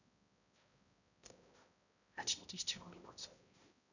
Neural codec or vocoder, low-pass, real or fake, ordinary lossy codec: codec, 16 kHz, 0.5 kbps, X-Codec, HuBERT features, trained on balanced general audio; 7.2 kHz; fake; none